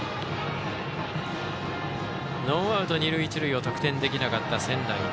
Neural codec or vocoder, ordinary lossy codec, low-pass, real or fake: none; none; none; real